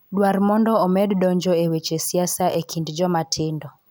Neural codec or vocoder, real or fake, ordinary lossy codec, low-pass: none; real; none; none